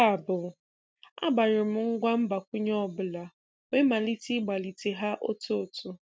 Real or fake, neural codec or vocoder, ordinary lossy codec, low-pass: real; none; none; none